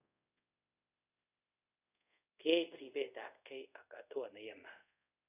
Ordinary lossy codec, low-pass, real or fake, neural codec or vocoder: none; 3.6 kHz; fake; codec, 24 kHz, 0.5 kbps, DualCodec